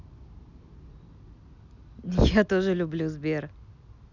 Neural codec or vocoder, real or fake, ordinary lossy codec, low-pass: none; real; none; 7.2 kHz